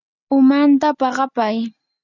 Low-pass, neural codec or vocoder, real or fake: 7.2 kHz; none; real